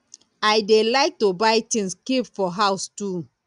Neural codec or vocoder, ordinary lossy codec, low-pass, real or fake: none; none; 9.9 kHz; real